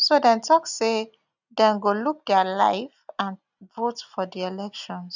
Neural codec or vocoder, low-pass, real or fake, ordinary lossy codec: none; 7.2 kHz; real; none